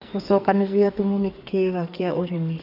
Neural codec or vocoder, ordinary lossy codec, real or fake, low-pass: codec, 44.1 kHz, 2.6 kbps, SNAC; none; fake; 5.4 kHz